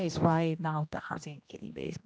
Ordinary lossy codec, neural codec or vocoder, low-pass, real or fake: none; codec, 16 kHz, 1 kbps, X-Codec, HuBERT features, trained on general audio; none; fake